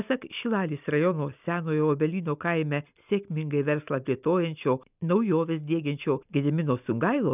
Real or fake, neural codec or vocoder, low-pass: real; none; 3.6 kHz